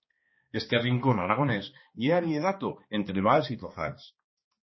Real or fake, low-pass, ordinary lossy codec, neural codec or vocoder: fake; 7.2 kHz; MP3, 24 kbps; codec, 16 kHz, 2 kbps, X-Codec, HuBERT features, trained on balanced general audio